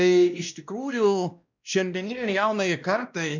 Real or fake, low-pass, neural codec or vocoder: fake; 7.2 kHz; codec, 16 kHz, 1 kbps, X-Codec, WavLM features, trained on Multilingual LibriSpeech